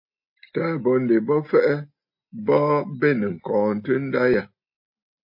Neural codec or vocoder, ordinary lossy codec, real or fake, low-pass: none; MP3, 32 kbps; real; 5.4 kHz